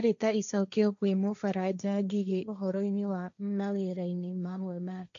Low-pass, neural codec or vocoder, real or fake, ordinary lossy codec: 7.2 kHz; codec, 16 kHz, 1.1 kbps, Voila-Tokenizer; fake; none